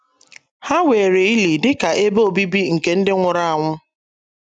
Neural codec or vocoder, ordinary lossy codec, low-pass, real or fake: none; none; none; real